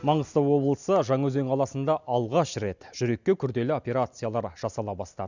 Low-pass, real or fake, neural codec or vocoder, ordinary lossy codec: 7.2 kHz; real; none; none